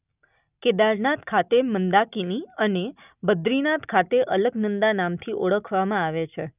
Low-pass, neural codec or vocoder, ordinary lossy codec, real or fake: 3.6 kHz; none; none; real